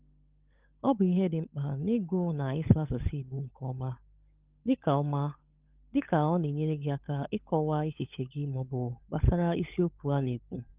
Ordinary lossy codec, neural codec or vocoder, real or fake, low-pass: Opus, 24 kbps; codec, 16 kHz, 16 kbps, FunCodec, trained on LibriTTS, 50 frames a second; fake; 3.6 kHz